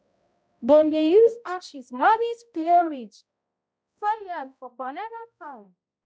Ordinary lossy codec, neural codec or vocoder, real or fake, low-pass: none; codec, 16 kHz, 0.5 kbps, X-Codec, HuBERT features, trained on balanced general audio; fake; none